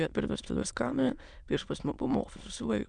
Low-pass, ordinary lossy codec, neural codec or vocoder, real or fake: 9.9 kHz; MP3, 96 kbps; autoencoder, 22.05 kHz, a latent of 192 numbers a frame, VITS, trained on many speakers; fake